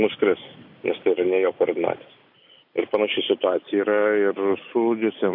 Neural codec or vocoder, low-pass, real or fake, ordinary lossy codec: none; 5.4 kHz; real; MP3, 48 kbps